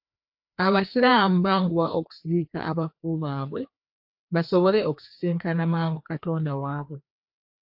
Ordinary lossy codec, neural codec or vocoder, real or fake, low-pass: AAC, 48 kbps; codec, 16 kHz, 2 kbps, FreqCodec, larger model; fake; 5.4 kHz